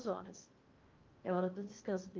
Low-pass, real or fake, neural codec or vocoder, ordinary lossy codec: 7.2 kHz; fake; codec, 16 kHz in and 24 kHz out, 0.8 kbps, FocalCodec, streaming, 65536 codes; Opus, 24 kbps